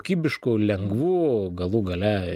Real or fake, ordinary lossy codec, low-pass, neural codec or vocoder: real; Opus, 32 kbps; 14.4 kHz; none